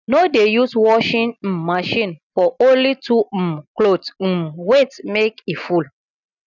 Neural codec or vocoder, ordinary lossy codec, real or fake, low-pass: none; none; real; 7.2 kHz